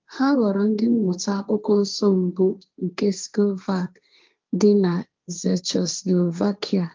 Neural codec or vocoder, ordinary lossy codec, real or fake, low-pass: codec, 16 kHz, 1.1 kbps, Voila-Tokenizer; Opus, 32 kbps; fake; 7.2 kHz